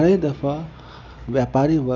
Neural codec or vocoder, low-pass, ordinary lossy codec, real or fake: none; 7.2 kHz; none; real